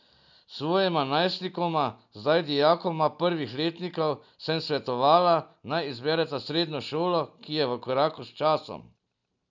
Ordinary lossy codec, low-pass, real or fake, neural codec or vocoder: none; 7.2 kHz; real; none